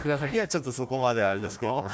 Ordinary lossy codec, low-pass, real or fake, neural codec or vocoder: none; none; fake; codec, 16 kHz, 1 kbps, FunCodec, trained on Chinese and English, 50 frames a second